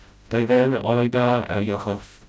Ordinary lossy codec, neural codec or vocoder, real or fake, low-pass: none; codec, 16 kHz, 0.5 kbps, FreqCodec, smaller model; fake; none